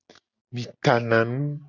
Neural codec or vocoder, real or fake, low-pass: none; real; 7.2 kHz